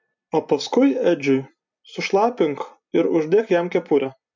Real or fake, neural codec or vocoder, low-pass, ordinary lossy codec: fake; vocoder, 44.1 kHz, 128 mel bands every 256 samples, BigVGAN v2; 7.2 kHz; MP3, 64 kbps